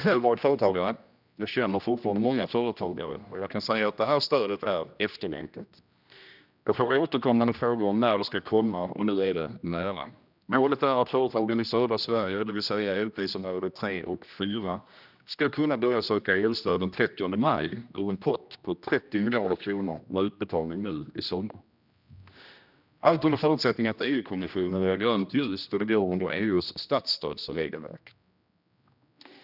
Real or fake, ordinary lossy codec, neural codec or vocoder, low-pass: fake; none; codec, 16 kHz, 1 kbps, X-Codec, HuBERT features, trained on general audio; 5.4 kHz